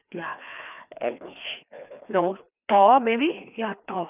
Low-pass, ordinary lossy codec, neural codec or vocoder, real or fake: 3.6 kHz; none; codec, 16 kHz, 2 kbps, FreqCodec, larger model; fake